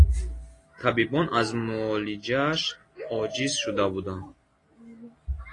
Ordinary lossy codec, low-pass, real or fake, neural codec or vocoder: AAC, 32 kbps; 10.8 kHz; real; none